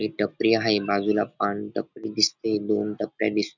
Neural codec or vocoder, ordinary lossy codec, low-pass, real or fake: none; none; 7.2 kHz; real